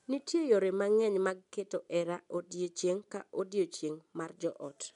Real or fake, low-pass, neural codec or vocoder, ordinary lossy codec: real; 10.8 kHz; none; MP3, 96 kbps